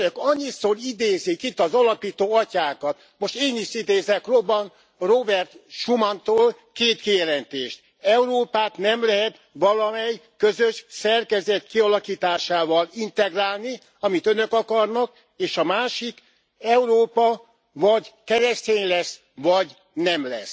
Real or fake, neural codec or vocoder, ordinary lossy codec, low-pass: real; none; none; none